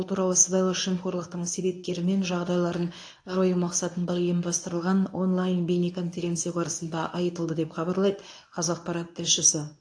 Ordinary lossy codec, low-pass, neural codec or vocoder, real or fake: AAC, 48 kbps; 9.9 kHz; codec, 24 kHz, 0.9 kbps, WavTokenizer, medium speech release version 1; fake